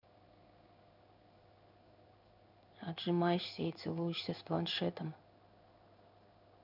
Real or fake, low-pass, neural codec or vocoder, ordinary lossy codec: fake; 5.4 kHz; codec, 16 kHz in and 24 kHz out, 1 kbps, XY-Tokenizer; none